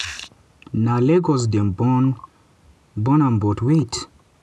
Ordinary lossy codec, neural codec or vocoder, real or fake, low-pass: none; none; real; none